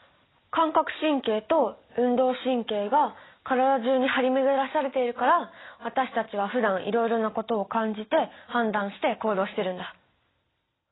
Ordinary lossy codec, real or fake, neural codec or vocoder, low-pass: AAC, 16 kbps; real; none; 7.2 kHz